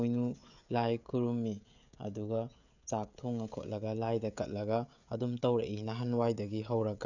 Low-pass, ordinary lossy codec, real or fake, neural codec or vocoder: 7.2 kHz; none; fake; codec, 16 kHz, 16 kbps, FreqCodec, smaller model